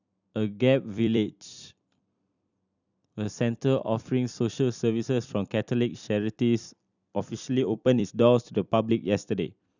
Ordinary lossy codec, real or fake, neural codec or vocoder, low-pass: none; fake; vocoder, 44.1 kHz, 128 mel bands every 256 samples, BigVGAN v2; 7.2 kHz